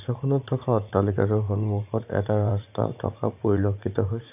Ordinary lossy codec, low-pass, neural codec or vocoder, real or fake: none; 3.6 kHz; vocoder, 44.1 kHz, 80 mel bands, Vocos; fake